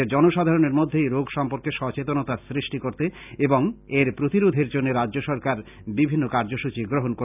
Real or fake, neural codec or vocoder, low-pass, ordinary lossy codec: real; none; 3.6 kHz; none